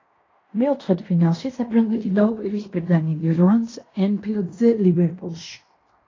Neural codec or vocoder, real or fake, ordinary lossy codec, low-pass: codec, 16 kHz in and 24 kHz out, 0.9 kbps, LongCat-Audio-Codec, fine tuned four codebook decoder; fake; AAC, 32 kbps; 7.2 kHz